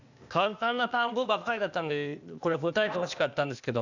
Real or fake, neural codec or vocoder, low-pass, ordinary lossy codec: fake; codec, 16 kHz, 0.8 kbps, ZipCodec; 7.2 kHz; none